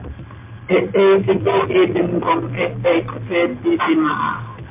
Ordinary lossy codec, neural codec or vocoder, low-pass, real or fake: MP3, 32 kbps; none; 3.6 kHz; real